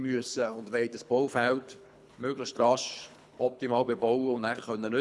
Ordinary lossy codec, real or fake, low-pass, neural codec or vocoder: none; fake; none; codec, 24 kHz, 3 kbps, HILCodec